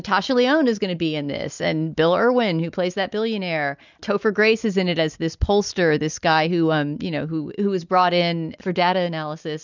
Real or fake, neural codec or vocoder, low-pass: real; none; 7.2 kHz